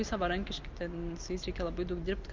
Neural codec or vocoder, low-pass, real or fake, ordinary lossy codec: none; 7.2 kHz; real; Opus, 24 kbps